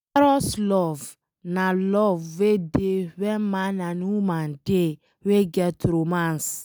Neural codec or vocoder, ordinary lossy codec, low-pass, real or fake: none; none; none; real